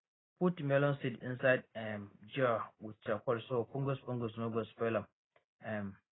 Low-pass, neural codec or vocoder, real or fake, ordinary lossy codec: 7.2 kHz; none; real; AAC, 16 kbps